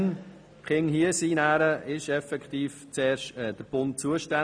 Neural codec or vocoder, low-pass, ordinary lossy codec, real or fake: none; 9.9 kHz; none; real